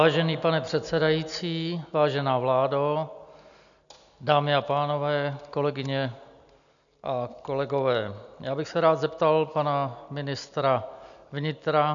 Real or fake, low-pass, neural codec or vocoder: real; 7.2 kHz; none